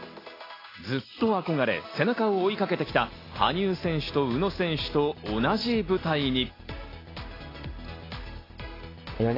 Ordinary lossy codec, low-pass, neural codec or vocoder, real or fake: AAC, 24 kbps; 5.4 kHz; none; real